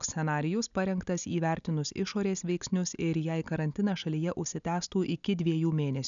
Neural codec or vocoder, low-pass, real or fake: none; 7.2 kHz; real